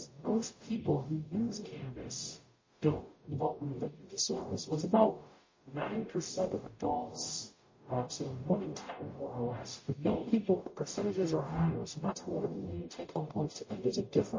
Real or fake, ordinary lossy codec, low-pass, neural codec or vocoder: fake; MP3, 32 kbps; 7.2 kHz; codec, 44.1 kHz, 0.9 kbps, DAC